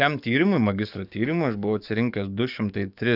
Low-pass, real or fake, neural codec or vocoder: 5.4 kHz; fake; codec, 44.1 kHz, 7.8 kbps, DAC